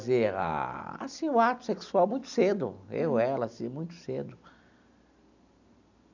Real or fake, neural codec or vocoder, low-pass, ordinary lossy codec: real; none; 7.2 kHz; none